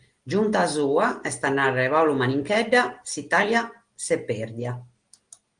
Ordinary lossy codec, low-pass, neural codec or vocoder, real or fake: Opus, 24 kbps; 9.9 kHz; none; real